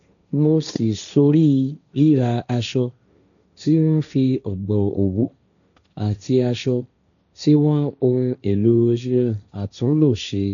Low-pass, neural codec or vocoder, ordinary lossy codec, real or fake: 7.2 kHz; codec, 16 kHz, 1.1 kbps, Voila-Tokenizer; none; fake